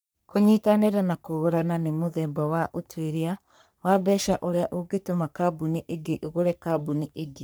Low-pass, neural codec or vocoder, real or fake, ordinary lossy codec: none; codec, 44.1 kHz, 3.4 kbps, Pupu-Codec; fake; none